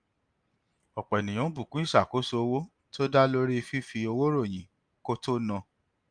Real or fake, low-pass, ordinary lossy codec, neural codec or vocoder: real; 9.9 kHz; Opus, 32 kbps; none